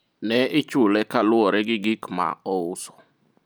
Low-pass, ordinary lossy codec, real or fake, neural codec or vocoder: none; none; real; none